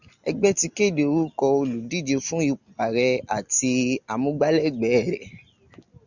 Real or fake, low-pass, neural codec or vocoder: real; 7.2 kHz; none